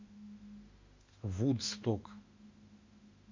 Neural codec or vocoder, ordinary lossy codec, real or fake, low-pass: autoencoder, 48 kHz, 32 numbers a frame, DAC-VAE, trained on Japanese speech; MP3, 64 kbps; fake; 7.2 kHz